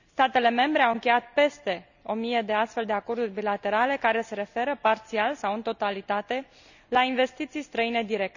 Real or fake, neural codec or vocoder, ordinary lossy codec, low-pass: real; none; none; 7.2 kHz